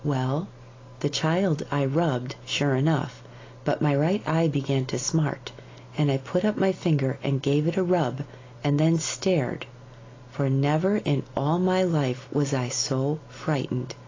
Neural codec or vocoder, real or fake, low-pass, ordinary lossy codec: none; real; 7.2 kHz; AAC, 32 kbps